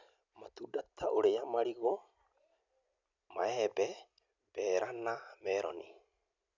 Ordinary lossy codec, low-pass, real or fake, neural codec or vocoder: none; 7.2 kHz; real; none